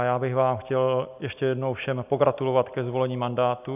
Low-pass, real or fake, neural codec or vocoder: 3.6 kHz; real; none